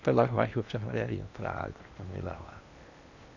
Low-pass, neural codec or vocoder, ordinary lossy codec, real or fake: 7.2 kHz; codec, 16 kHz in and 24 kHz out, 0.8 kbps, FocalCodec, streaming, 65536 codes; none; fake